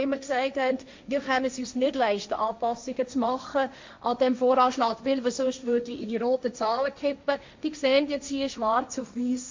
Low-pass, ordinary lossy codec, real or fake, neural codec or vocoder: 7.2 kHz; MP3, 64 kbps; fake; codec, 16 kHz, 1.1 kbps, Voila-Tokenizer